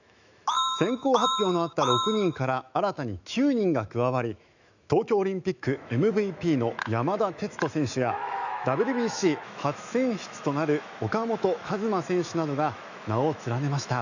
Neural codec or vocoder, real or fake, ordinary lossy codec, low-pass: autoencoder, 48 kHz, 128 numbers a frame, DAC-VAE, trained on Japanese speech; fake; none; 7.2 kHz